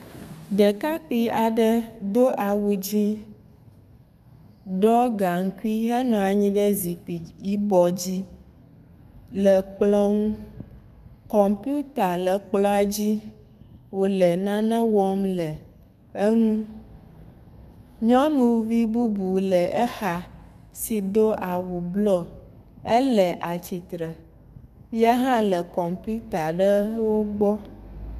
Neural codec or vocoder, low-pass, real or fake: codec, 32 kHz, 1.9 kbps, SNAC; 14.4 kHz; fake